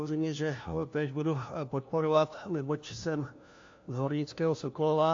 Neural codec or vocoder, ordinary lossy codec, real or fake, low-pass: codec, 16 kHz, 1 kbps, FunCodec, trained on LibriTTS, 50 frames a second; AAC, 48 kbps; fake; 7.2 kHz